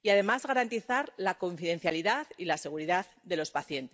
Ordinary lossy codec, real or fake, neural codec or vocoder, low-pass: none; real; none; none